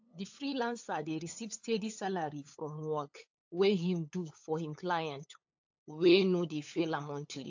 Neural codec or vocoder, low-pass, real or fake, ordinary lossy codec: codec, 16 kHz, 8 kbps, FunCodec, trained on LibriTTS, 25 frames a second; 7.2 kHz; fake; AAC, 48 kbps